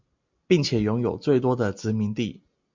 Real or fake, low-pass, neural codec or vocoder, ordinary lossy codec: real; 7.2 kHz; none; MP3, 64 kbps